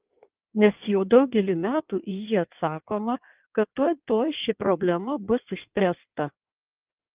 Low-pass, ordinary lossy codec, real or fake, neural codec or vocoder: 3.6 kHz; Opus, 24 kbps; fake; codec, 16 kHz in and 24 kHz out, 1.1 kbps, FireRedTTS-2 codec